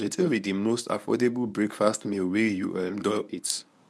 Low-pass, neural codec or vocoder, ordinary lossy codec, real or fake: none; codec, 24 kHz, 0.9 kbps, WavTokenizer, medium speech release version 1; none; fake